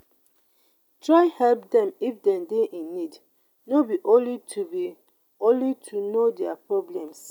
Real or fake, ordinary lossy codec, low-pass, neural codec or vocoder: real; none; 19.8 kHz; none